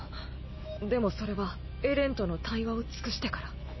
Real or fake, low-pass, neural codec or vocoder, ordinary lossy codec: real; 7.2 kHz; none; MP3, 24 kbps